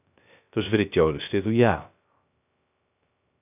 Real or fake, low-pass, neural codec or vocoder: fake; 3.6 kHz; codec, 16 kHz, 0.3 kbps, FocalCodec